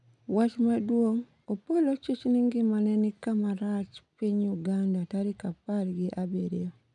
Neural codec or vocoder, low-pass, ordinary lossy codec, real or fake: none; 10.8 kHz; none; real